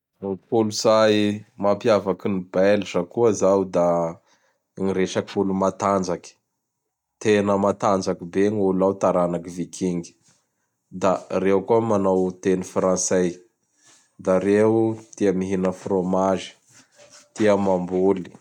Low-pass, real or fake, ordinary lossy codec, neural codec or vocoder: 19.8 kHz; real; none; none